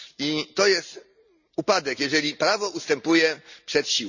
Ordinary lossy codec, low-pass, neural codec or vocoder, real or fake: none; 7.2 kHz; none; real